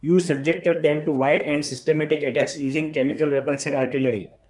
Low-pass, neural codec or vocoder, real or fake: 10.8 kHz; codec, 24 kHz, 1 kbps, SNAC; fake